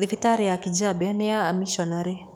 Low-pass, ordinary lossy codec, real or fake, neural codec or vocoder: none; none; fake; codec, 44.1 kHz, 7.8 kbps, DAC